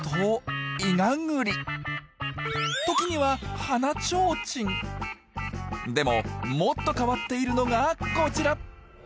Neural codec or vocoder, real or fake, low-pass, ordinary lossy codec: none; real; none; none